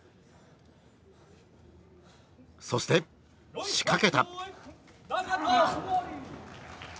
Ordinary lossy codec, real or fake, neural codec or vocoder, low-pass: none; real; none; none